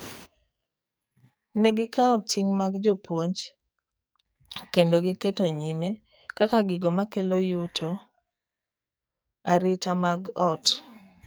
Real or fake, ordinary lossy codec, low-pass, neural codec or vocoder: fake; none; none; codec, 44.1 kHz, 2.6 kbps, SNAC